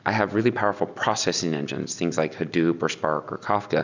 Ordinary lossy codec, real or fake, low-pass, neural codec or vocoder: Opus, 64 kbps; real; 7.2 kHz; none